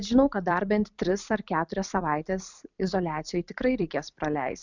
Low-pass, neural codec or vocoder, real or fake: 7.2 kHz; vocoder, 44.1 kHz, 128 mel bands every 256 samples, BigVGAN v2; fake